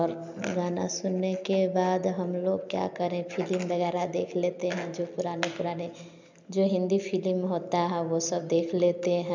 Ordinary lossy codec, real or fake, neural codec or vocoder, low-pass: MP3, 64 kbps; real; none; 7.2 kHz